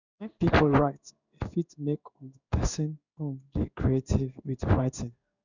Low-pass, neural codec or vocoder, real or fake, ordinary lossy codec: 7.2 kHz; codec, 16 kHz in and 24 kHz out, 1 kbps, XY-Tokenizer; fake; none